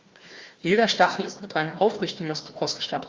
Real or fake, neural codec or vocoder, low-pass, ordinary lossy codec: fake; codec, 16 kHz, 1 kbps, FunCodec, trained on Chinese and English, 50 frames a second; 7.2 kHz; Opus, 32 kbps